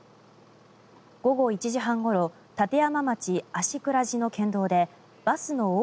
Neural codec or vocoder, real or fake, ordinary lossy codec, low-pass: none; real; none; none